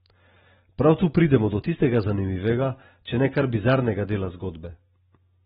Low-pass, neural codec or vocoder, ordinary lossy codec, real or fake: 10.8 kHz; none; AAC, 16 kbps; real